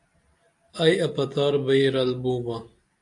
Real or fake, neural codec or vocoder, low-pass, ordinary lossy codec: real; none; 10.8 kHz; AAC, 64 kbps